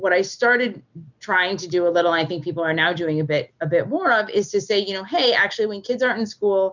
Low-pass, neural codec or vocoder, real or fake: 7.2 kHz; none; real